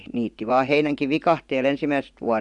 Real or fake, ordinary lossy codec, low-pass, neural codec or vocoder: fake; none; 10.8 kHz; vocoder, 24 kHz, 100 mel bands, Vocos